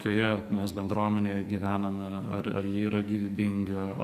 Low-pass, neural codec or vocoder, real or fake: 14.4 kHz; codec, 44.1 kHz, 2.6 kbps, SNAC; fake